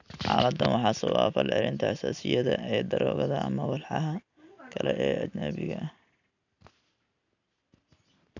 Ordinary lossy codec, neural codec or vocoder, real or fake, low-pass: none; none; real; 7.2 kHz